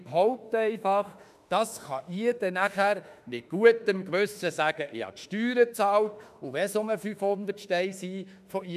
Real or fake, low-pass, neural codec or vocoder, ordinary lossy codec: fake; 14.4 kHz; autoencoder, 48 kHz, 32 numbers a frame, DAC-VAE, trained on Japanese speech; none